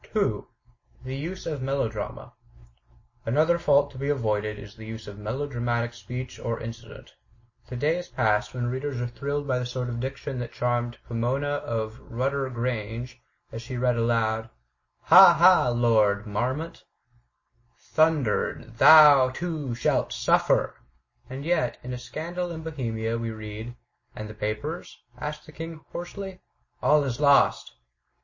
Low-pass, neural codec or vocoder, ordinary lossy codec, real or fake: 7.2 kHz; none; MP3, 32 kbps; real